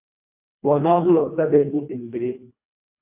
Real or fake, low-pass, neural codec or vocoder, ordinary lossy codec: fake; 3.6 kHz; codec, 24 kHz, 1.5 kbps, HILCodec; MP3, 32 kbps